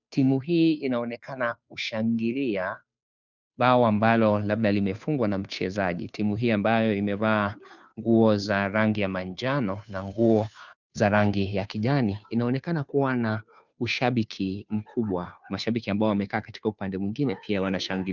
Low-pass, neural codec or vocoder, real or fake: 7.2 kHz; codec, 16 kHz, 2 kbps, FunCodec, trained on Chinese and English, 25 frames a second; fake